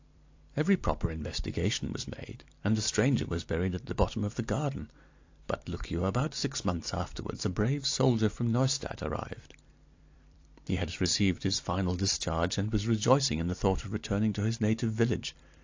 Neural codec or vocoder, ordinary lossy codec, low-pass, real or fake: vocoder, 44.1 kHz, 128 mel bands every 256 samples, BigVGAN v2; AAC, 48 kbps; 7.2 kHz; fake